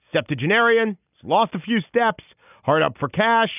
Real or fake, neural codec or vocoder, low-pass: real; none; 3.6 kHz